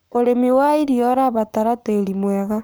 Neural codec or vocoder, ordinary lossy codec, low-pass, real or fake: codec, 44.1 kHz, 7.8 kbps, Pupu-Codec; none; none; fake